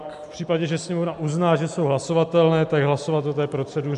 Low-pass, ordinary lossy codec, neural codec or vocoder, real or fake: 10.8 kHz; MP3, 96 kbps; none; real